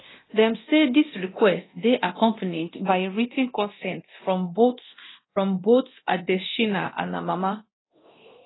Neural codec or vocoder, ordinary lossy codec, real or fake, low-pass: codec, 24 kHz, 0.9 kbps, DualCodec; AAC, 16 kbps; fake; 7.2 kHz